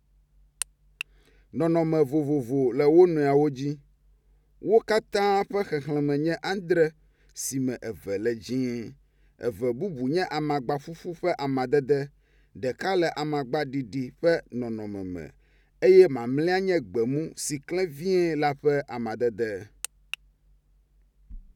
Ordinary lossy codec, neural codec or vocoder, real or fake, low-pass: none; none; real; 19.8 kHz